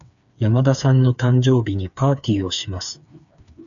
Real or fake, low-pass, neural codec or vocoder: fake; 7.2 kHz; codec, 16 kHz, 4 kbps, FreqCodec, smaller model